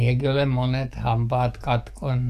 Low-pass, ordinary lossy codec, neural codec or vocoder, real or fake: 14.4 kHz; none; codec, 44.1 kHz, 7.8 kbps, DAC; fake